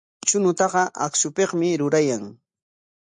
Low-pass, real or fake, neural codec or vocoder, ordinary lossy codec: 9.9 kHz; real; none; MP3, 64 kbps